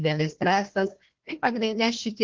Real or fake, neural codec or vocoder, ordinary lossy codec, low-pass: fake; codec, 16 kHz, 1 kbps, FunCodec, trained on Chinese and English, 50 frames a second; Opus, 16 kbps; 7.2 kHz